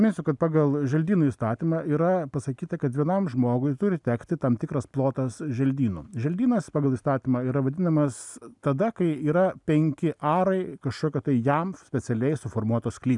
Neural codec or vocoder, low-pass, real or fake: none; 10.8 kHz; real